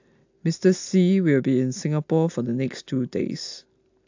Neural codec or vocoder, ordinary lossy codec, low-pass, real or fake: none; AAC, 48 kbps; 7.2 kHz; real